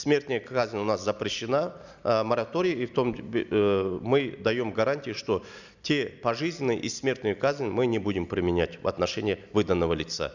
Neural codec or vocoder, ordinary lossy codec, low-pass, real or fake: none; none; 7.2 kHz; real